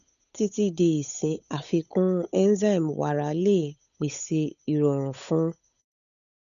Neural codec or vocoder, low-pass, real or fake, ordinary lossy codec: codec, 16 kHz, 8 kbps, FunCodec, trained on Chinese and English, 25 frames a second; 7.2 kHz; fake; none